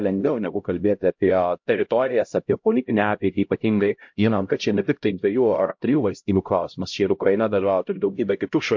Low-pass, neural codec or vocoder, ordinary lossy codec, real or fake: 7.2 kHz; codec, 16 kHz, 0.5 kbps, X-Codec, HuBERT features, trained on LibriSpeech; MP3, 48 kbps; fake